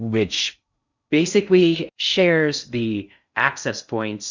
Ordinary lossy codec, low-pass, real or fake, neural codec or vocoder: Opus, 64 kbps; 7.2 kHz; fake; codec, 16 kHz in and 24 kHz out, 0.6 kbps, FocalCodec, streaming, 4096 codes